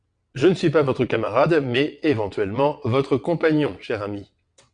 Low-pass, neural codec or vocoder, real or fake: 9.9 kHz; vocoder, 22.05 kHz, 80 mel bands, WaveNeXt; fake